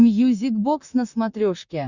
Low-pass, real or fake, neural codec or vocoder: 7.2 kHz; fake; vocoder, 44.1 kHz, 128 mel bands, Pupu-Vocoder